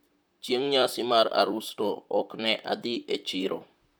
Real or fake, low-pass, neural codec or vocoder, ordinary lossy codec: fake; none; vocoder, 44.1 kHz, 128 mel bands, Pupu-Vocoder; none